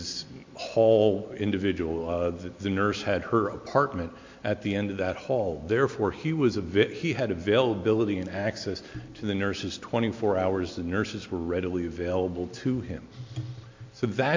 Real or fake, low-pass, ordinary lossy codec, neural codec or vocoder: real; 7.2 kHz; MP3, 48 kbps; none